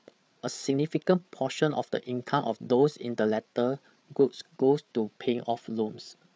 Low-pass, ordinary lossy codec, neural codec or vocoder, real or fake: none; none; codec, 16 kHz, 8 kbps, FreqCodec, larger model; fake